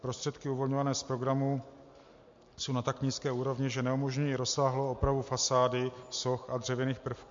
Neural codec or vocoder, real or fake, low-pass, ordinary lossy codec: none; real; 7.2 kHz; MP3, 48 kbps